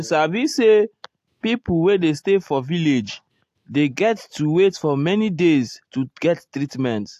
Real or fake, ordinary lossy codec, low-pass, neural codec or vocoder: real; AAC, 64 kbps; 14.4 kHz; none